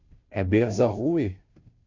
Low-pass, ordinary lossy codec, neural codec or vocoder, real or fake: 7.2 kHz; MP3, 48 kbps; codec, 16 kHz, 0.5 kbps, FunCodec, trained on Chinese and English, 25 frames a second; fake